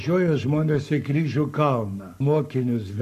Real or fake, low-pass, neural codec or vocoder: fake; 14.4 kHz; codec, 44.1 kHz, 7.8 kbps, Pupu-Codec